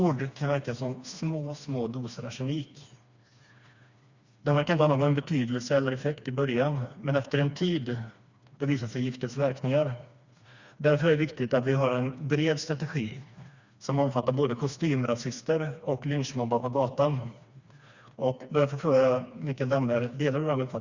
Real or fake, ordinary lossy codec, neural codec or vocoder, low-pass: fake; Opus, 64 kbps; codec, 16 kHz, 2 kbps, FreqCodec, smaller model; 7.2 kHz